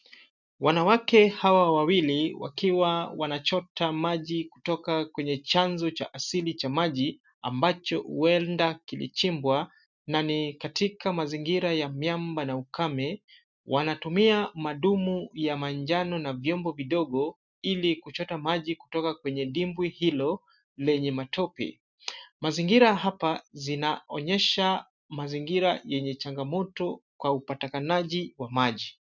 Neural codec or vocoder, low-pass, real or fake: none; 7.2 kHz; real